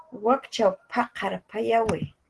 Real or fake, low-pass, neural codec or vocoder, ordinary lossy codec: real; 10.8 kHz; none; Opus, 16 kbps